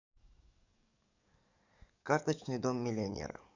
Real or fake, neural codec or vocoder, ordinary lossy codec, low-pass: fake; codec, 44.1 kHz, 7.8 kbps, DAC; none; 7.2 kHz